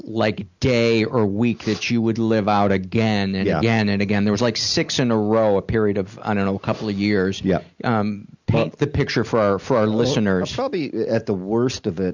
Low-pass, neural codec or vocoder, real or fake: 7.2 kHz; none; real